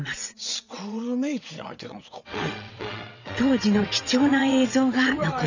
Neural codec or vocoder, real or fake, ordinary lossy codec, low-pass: vocoder, 22.05 kHz, 80 mel bands, WaveNeXt; fake; none; 7.2 kHz